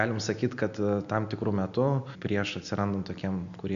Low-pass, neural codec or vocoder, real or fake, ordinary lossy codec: 7.2 kHz; none; real; MP3, 96 kbps